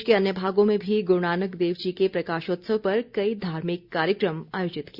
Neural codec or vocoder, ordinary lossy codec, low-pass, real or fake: none; Opus, 64 kbps; 5.4 kHz; real